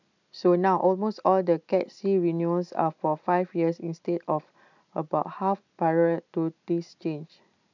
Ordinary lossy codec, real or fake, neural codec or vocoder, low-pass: none; real; none; 7.2 kHz